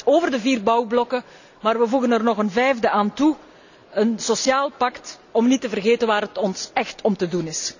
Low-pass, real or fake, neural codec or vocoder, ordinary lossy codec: 7.2 kHz; real; none; none